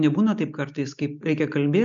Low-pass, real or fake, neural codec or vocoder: 7.2 kHz; real; none